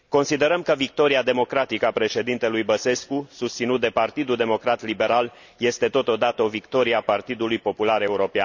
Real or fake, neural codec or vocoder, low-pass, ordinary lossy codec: real; none; 7.2 kHz; none